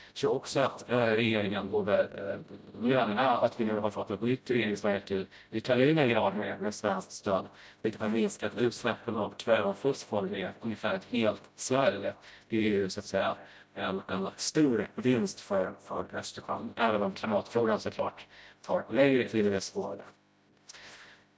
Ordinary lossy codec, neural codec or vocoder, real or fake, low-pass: none; codec, 16 kHz, 0.5 kbps, FreqCodec, smaller model; fake; none